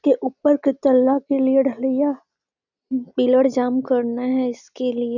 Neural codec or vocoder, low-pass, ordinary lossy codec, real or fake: none; 7.2 kHz; none; real